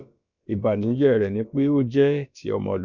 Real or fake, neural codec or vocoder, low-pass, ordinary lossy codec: fake; codec, 16 kHz, about 1 kbps, DyCAST, with the encoder's durations; 7.2 kHz; none